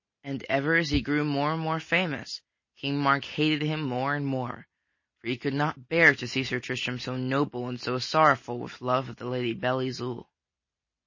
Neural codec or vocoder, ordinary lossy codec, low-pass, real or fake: none; MP3, 32 kbps; 7.2 kHz; real